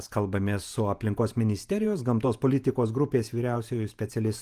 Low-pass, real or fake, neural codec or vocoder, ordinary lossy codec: 14.4 kHz; fake; vocoder, 48 kHz, 128 mel bands, Vocos; Opus, 32 kbps